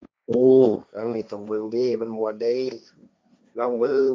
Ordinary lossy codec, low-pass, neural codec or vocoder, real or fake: none; none; codec, 16 kHz, 1.1 kbps, Voila-Tokenizer; fake